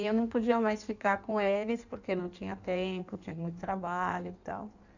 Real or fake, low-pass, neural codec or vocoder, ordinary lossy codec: fake; 7.2 kHz; codec, 16 kHz in and 24 kHz out, 1.1 kbps, FireRedTTS-2 codec; none